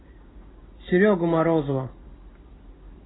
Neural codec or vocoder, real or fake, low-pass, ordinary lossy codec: none; real; 7.2 kHz; AAC, 16 kbps